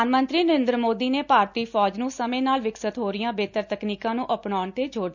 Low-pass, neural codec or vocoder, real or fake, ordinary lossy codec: 7.2 kHz; none; real; none